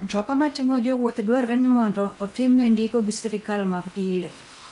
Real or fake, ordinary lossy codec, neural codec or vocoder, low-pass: fake; none; codec, 16 kHz in and 24 kHz out, 0.8 kbps, FocalCodec, streaming, 65536 codes; 10.8 kHz